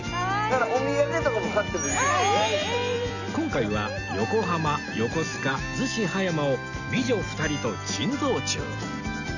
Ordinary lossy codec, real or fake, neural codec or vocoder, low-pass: none; real; none; 7.2 kHz